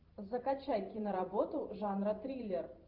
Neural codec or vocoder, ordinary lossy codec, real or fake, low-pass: vocoder, 44.1 kHz, 128 mel bands every 512 samples, BigVGAN v2; Opus, 24 kbps; fake; 5.4 kHz